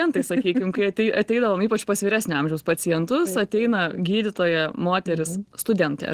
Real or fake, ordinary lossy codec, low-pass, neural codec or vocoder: real; Opus, 16 kbps; 14.4 kHz; none